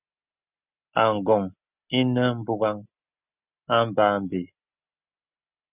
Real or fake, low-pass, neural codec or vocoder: real; 3.6 kHz; none